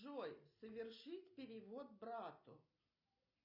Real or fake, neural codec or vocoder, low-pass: real; none; 5.4 kHz